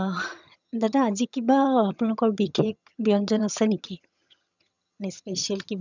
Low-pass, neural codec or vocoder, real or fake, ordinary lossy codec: 7.2 kHz; vocoder, 22.05 kHz, 80 mel bands, HiFi-GAN; fake; none